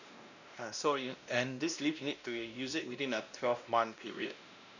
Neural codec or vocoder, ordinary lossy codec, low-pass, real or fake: codec, 16 kHz, 1 kbps, X-Codec, WavLM features, trained on Multilingual LibriSpeech; none; 7.2 kHz; fake